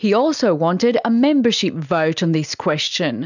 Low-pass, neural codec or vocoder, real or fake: 7.2 kHz; none; real